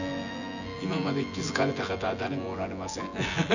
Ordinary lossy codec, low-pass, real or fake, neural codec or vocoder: none; 7.2 kHz; fake; vocoder, 24 kHz, 100 mel bands, Vocos